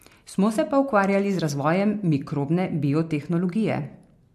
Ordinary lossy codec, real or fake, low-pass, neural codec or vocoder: MP3, 64 kbps; real; 14.4 kHz; none